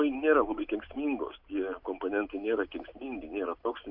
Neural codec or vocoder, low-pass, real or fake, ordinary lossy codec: none; 5.4 kHz; real; AAC, 48 kbps